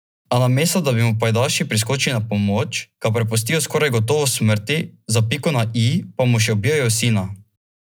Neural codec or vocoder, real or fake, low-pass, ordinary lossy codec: none; real; none; none